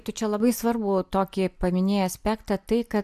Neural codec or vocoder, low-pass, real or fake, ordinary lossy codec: none; 14.4 kHz; real; AAC, 96 kbps